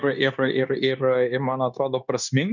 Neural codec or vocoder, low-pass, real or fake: codec, 16 kHz, 0.9 kbps, LongCat-Audio-Codec; 7.2 kHz; fake